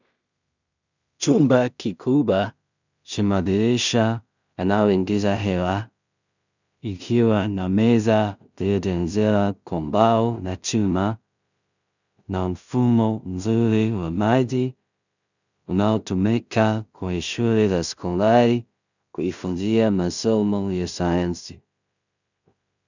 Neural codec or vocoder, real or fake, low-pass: codec, 16 kHz in and 24 kHz out, 0.4 kbps, LongCat-Audio-Codec, two codebook decoder; fake; 7.2 kHz